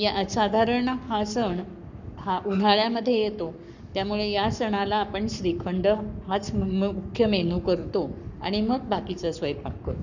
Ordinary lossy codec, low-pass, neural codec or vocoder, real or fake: none; 7.2 kHz; codec, 44.1 kHz, 7.8 kbps, Pupu-Codec; fake